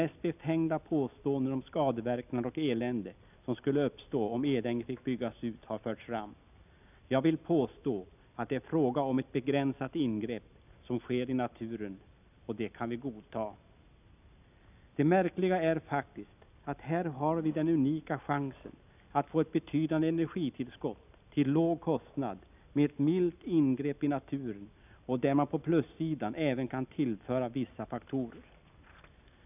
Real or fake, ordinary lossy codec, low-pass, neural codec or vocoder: real; none; 3.6 kHz; none